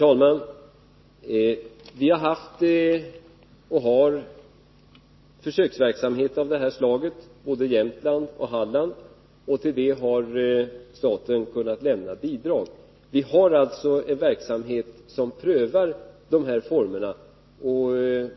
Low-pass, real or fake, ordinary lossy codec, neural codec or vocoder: 7.2 kHz; real; MP3, 24 kbps; none